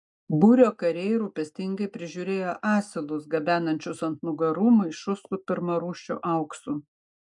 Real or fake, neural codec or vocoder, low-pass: real; none; 10.8 kHz